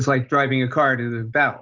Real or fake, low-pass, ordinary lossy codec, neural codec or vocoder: real; 7.2 kHz; Opus, 32 kbps; none